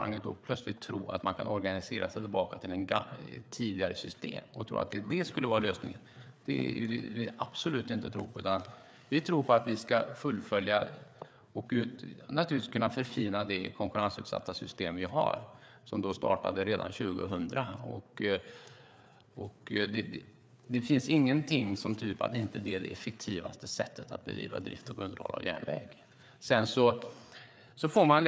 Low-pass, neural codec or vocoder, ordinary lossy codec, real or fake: none; codec, 16 kHz, 4 kbps, FreqCodec, larger model; none; fake